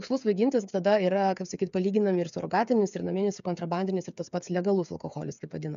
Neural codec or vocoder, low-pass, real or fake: codec, 16 kHz, 8 kbps, FreqCodec, smaller model; 7.2 kHz; fake